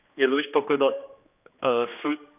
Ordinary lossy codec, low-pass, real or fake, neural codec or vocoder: none; 3.6 kHz; fake; codec, 16 kHz, 2 kbps, X-Codec, HuBERT features, trained on general audio